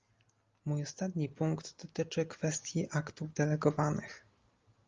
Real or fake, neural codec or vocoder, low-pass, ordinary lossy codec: real; none; 7.2 kHz; Opus, 32 kbps